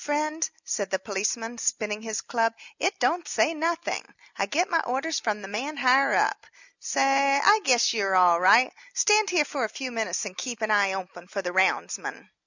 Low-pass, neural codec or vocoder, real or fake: 7.2 kHz; none; real